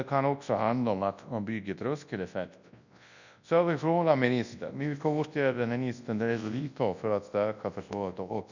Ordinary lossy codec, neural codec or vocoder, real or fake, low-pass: none; codec, 24 kHz, 0.9 kbps, WavTokenizer, large speech release; fake; 7.2 kHz